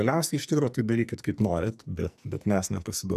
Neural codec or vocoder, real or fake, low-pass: codec, 44.1 kHz, 2.6 kbps, SNAC; fake; 14.4 kHz